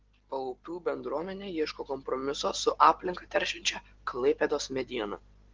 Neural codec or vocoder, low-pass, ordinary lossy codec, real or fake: none; 7.2 kHz; Opus, 16 kbps; real